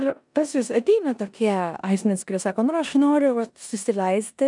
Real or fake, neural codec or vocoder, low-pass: fake; codec, 16 kHz in and 24 kHz out, 0.9 kbps, LongCat-Audio-Codec, four codebook decoder; 10.8 kHz